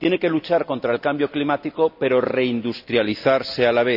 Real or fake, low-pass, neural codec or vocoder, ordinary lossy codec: real; 5.4 kHz; none; none